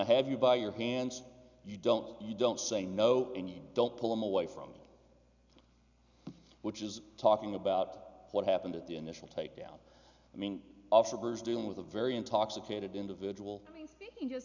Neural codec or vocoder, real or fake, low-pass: none; real; 7.2 kHz